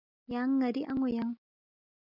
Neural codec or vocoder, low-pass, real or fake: none; 5.4 kHz; real